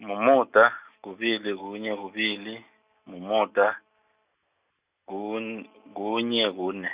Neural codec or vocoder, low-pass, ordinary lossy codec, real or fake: none; 3.6 kHz; Opus, 64 kbps; real